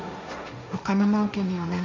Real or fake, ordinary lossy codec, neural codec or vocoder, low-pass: fake; MP3, 48 kbps; codec, 16 kHz, 1.1 kbps, Voila-Tokenizer; 7.2 kHz